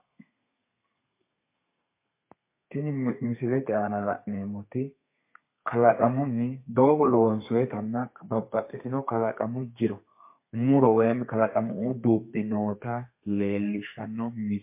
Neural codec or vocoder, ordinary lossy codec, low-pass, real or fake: codec, 32 kHz, 1.9 kbps, SNAC; MP3, 24 kbps; 3.6 kHz; fake